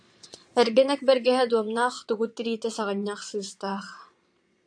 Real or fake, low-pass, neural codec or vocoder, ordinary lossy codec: fake; 9.9 kHz; vocoder, 22.05 kHz, 80 mel bands, Vocos; AAC, 64 kbps